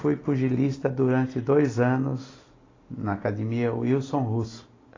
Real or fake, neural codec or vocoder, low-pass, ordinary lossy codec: real; none; 7.2 kHz; AAC, 32 kbps